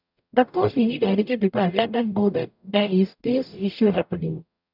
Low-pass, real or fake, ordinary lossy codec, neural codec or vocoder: 5.4 kHz; fake; none; codec, 44.1 kHz, 0.9 kbps, DAC